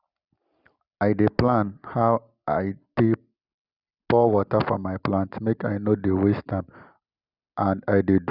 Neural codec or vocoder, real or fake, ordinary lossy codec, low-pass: none; real; Opus, 64 kbps; 5.4 kHz